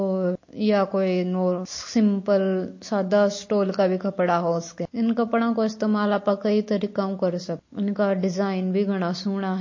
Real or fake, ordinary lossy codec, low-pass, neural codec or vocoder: real; MP3, 32 kbps; 7.2 kHz; none